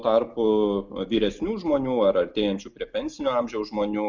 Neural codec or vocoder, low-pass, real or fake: none; 7.2 kHz; real